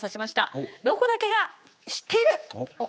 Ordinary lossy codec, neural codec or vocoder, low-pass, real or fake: none; codec, 16 kHz, 2 kbps, X-Codec, HuBERT features, trained on general audio; none; fake